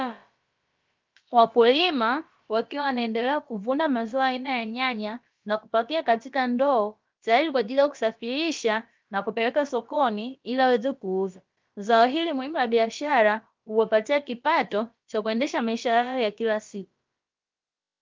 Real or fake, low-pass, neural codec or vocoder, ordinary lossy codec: fake; 7.2 kHz; codec, 16 kHz, about 1 kbps, DyCAST, with the encoder's durations; Opus, 24 kbps